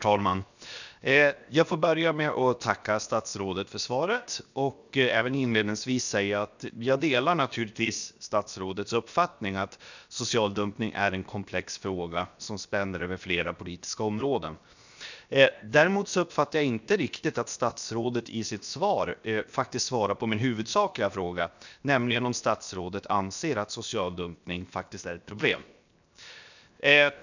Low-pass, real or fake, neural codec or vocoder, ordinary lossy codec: 7.2 kHz; fake; codec, 16 kHz, 0.7 kbps, FocalCodec; none